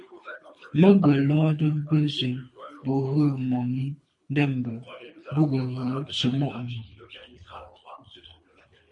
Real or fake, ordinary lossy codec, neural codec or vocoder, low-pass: fake; MP3, 48 kbps; codec, 24 kHz, 3 kbps, HILCodec; 10.8 kHz